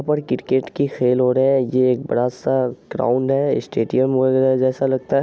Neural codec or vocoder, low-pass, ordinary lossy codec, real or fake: none; none; none; real